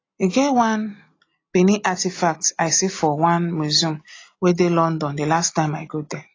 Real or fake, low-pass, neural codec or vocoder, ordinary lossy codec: real; 7.2 kHz; none; AAC, 32 kbps